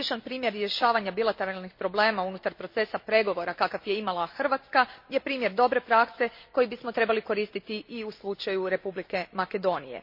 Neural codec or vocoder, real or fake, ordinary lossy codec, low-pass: none; real; MP3, 32 kbps; 5.4 kHz